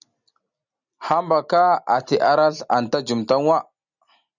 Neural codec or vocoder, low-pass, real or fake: none; 7.2 kHz; real